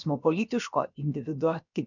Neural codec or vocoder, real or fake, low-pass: codec, 16 kHz, about 1 kbps, DyCAST, with the encoder's durations; fake; 7.2 kHz